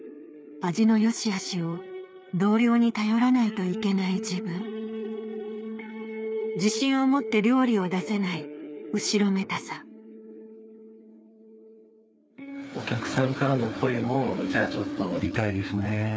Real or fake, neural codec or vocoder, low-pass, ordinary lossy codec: fake; codec, 16 kHz, 4 kbps, FreqCodec, larger model; none; none